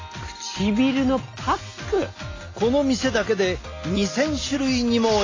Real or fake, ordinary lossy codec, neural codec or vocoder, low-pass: real; AAC, 32 kbps; none; 7.2 kHz